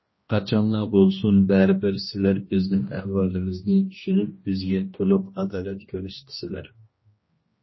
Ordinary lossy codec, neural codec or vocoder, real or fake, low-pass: MP3, 24 kbps; codec, 16 kHz, 1 kbps, X-Codec, HuBERT features, trained on general audio; fake; 7.2 kHz